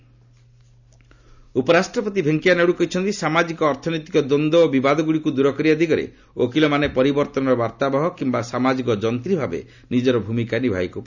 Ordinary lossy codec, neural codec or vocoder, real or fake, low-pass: none; none; real; 7.2 kHz